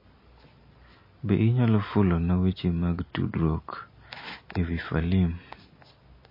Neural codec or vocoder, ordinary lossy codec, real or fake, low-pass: none; MP3, 24 kbps; real; 5.4 kHz